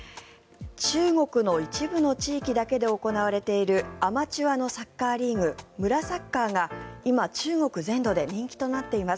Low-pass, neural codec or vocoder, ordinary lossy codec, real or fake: none; none; none; real